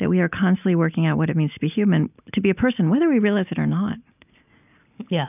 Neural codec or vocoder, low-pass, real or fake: none; 3.6 kHz; real